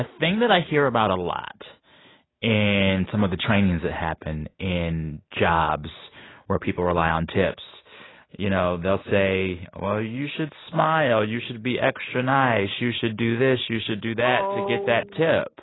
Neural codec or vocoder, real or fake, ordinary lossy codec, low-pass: none; real; AAC, 16 kbps; 7.2 kHz